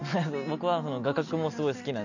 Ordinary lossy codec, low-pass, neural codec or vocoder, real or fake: none; 7.2 kHz; none; real